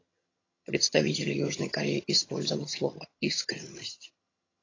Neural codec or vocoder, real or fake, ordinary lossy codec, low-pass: vocoder, 22.05 kHz, 80 mel bands, HiFi-GAN; fake; AAC, 32 kbps; 7.2 kHz